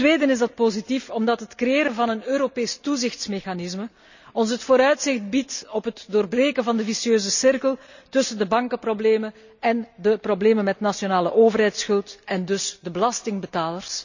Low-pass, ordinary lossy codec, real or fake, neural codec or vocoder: 7.2 kHz; none; real; none